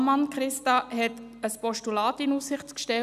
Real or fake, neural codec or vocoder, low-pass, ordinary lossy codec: real; none; 14.4 kHz; none